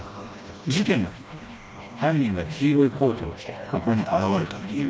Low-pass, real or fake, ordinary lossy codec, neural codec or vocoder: none; fake; none; codec, 16 kHz, 1 kbps, FreqCodec, smaller model